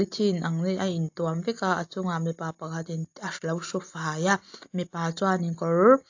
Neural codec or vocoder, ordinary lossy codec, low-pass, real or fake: none; AAC, 48 kbps; 7.2 kHz; real